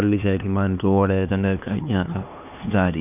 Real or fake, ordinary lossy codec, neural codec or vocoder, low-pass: fake; none; codec, 16 kHz, 2 kbps, X-Codec, HuBERT features, trained on LibriSpeech; 3.6 kHz